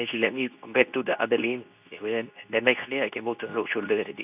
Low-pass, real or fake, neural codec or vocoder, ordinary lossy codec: 3.6 kHz; fake; codec, 24 kHz, 0.9 kbps, WavTokenizer, medium speech release version 2; none